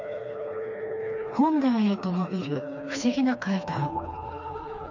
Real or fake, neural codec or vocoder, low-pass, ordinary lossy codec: fake; codec, 16 kHz, 2 kbps, FreqCodec, smaller model; 7.2 kHz; none